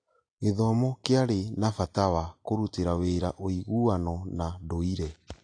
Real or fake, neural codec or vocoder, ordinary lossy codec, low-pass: real; none; AAC, 48 kbps; 9.9 kHz